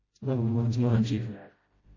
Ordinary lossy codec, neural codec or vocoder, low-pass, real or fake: MP3, 32 kbps; codec, 16 kHz, 0.5 kbps, FreqCodec, smaller model; 7.2 kHz; fake